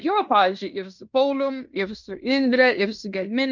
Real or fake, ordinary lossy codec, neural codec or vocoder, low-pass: fake; MP3, 64 kbps; codec, 16 kHz in and 24 kHz out, 0.9 kbps, LongCat-Audio-Codec, fine tuned four codebook decoder; 7.2 kHz